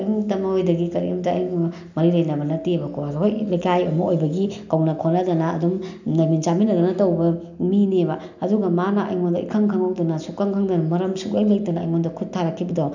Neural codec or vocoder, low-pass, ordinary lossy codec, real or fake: none; 7.2 kHz; none; real